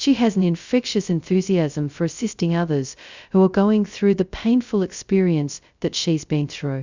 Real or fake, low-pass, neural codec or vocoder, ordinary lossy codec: fake; 7.2 kHz; codec, 16 kHz, 0.2 kbps, FocalCodec; Opus, 64 kbps